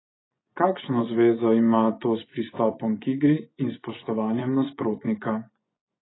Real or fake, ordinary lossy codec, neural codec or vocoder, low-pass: fake; AAC, 16 kbps; vocoder, 24 kHz, 100 mel bands, Vocos; 7.2 kHz